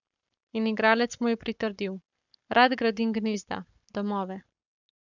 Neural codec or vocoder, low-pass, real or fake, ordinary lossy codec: codec, 44.1 kHz, 7.8 kbps, Pupu-Codec; 7.2 kHz; fake; none